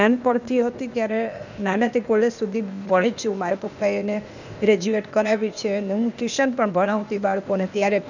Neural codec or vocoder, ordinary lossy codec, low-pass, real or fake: codec, 16 kHz, 0.8 kbps, ZipCodec; none; 7.2 kHz; fake